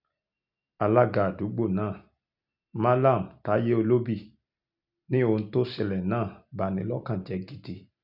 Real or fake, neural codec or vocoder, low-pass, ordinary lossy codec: real; none; 5.4 kHz; none